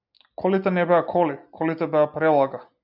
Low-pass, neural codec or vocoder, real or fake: 5.4 kHz; none; real